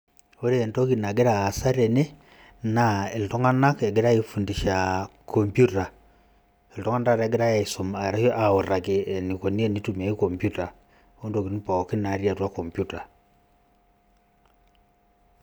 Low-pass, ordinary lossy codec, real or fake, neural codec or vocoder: none; none; real; none